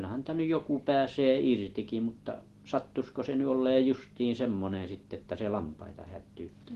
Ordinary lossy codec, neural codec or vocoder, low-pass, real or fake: Opus, 16 kbps; none; 14.4 kHz; real